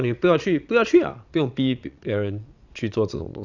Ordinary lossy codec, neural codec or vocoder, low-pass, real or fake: none; vocoder, 22.05 kHz, 80 mel bands, Vocos; 7.2 kHz; fake